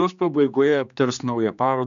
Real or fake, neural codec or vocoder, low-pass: fake; codec, 16 kHz, 2 kbps, X-Codec, HuBERT features, trained on general audio; 7.2 kHz